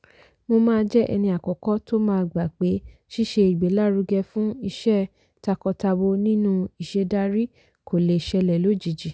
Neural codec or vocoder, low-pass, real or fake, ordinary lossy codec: none; none; real; none